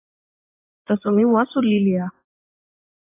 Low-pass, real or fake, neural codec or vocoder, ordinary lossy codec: 3.6 kHz; fake; vocoder, 24 kHz, 100 mel bands, Vocos; AAC, 24 kbps